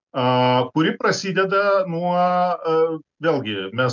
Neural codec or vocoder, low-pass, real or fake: none; 7.2 kHz; real